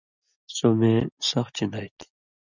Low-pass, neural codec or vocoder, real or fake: 7.2 kHz; none; real